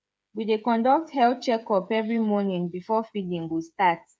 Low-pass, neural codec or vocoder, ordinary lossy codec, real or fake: none; codec, 16 kHz, 8 kbps, FreqCodec, smaller model; none; fake